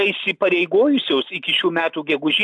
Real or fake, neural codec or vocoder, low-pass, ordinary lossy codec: real; none; 10.8 kHz; AAC, 64 kbps